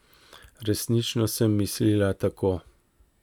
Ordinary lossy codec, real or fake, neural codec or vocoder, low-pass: none; real; none; 19.8 kHz